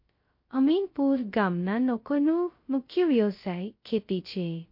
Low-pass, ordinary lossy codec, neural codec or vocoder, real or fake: 5.4 kHz; MP3, 32 kbps; codec, 16 kHz, 0.2 kbps, FocalCodec; fake